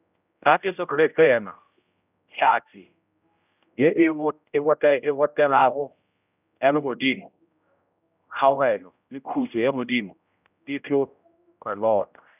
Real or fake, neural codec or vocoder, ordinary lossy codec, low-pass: fake; codec, 16 kHz, 0.5 kbps, X-Codec, HuBERT features, trained on general audio; none; 3.6 kHz